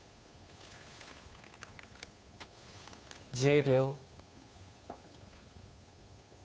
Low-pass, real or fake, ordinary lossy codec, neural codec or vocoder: none; fake; none; codec, 16 kHz, 2 kbps, FunCodec, trained on Chinese and English, 25 frames a second